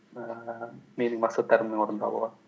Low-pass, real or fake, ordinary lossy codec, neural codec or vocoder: none; real; none; none